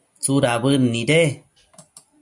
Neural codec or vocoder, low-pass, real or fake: none; 10.8 kHz; real